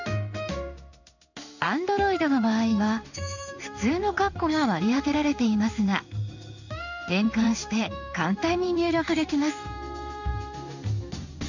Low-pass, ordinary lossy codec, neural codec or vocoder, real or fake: 7.2 kHz; none; codec, 16 kHz in and 24 kHz out, 1 kbps, XY-Tokenizer; fake